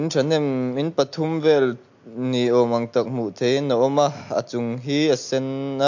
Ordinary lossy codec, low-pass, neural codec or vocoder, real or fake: MP3, 48 kbps; 7.2 kHz; none; real